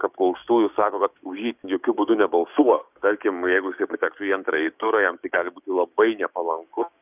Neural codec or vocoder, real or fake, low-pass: codec, 16 kHz, 6 kbps, DAC; fake; 3.6 kHz